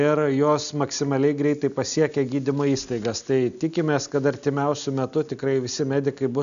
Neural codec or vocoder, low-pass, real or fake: none; 7.2 kHz; real